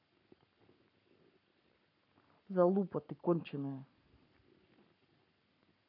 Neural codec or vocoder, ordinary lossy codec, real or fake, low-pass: codec, 44.1 kHz, 7.8 kbps, Pupu-Codec; none; fake; 5.4 kHz